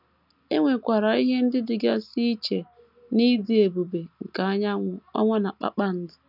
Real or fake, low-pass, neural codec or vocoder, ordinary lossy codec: real; 5.4 kHz; none; none